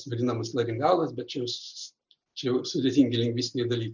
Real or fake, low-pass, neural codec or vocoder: real; 7.2 kHz; none